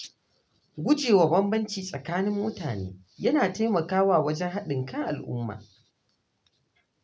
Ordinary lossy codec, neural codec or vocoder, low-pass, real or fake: none; none; none; real